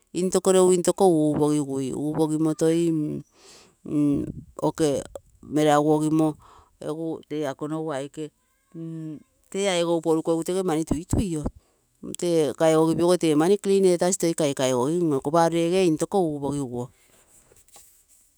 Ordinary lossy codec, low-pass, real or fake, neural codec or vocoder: none; none; fake; autoencoder, 48 kHz, 128 numbers a frame, DAC-VAE, trained on Japanese speech